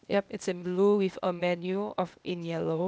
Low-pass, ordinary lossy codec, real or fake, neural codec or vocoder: none; none; fake; codec, 16 kHz, 0.8 kbps, ZipCodec